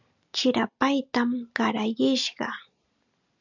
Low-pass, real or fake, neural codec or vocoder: 7.2 kHz; real; none